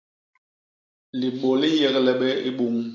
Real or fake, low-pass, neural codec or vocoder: real; 7.2 kHz; none